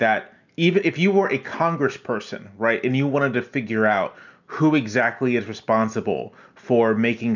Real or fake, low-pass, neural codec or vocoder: real; 7.2 kHz; none